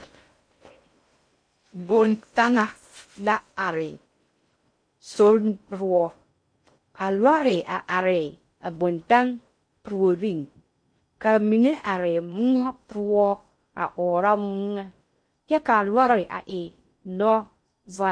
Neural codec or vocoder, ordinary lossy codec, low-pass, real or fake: codec, 16 kHz in and 24 kHz out, 0.6 kbps, FocalCodec, streaming, 2048 codes; MP3, 48 kbps; 9.9 kHz; fake